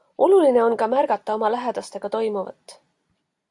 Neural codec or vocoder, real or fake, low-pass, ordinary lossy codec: none; real; 10.8 kHz; AAC, 64 kbps